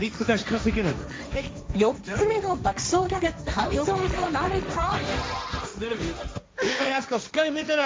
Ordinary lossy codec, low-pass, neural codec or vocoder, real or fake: none; none; codec, 16 kHz, 1.1 kbps, Voila-Tokenizer; fake